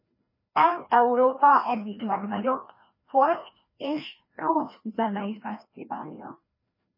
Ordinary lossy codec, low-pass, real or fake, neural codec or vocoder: MP3, 24 kbps; 5.4 kHz; fake; codec, 16 kHz, 1 kbps, FreqCodec, larger model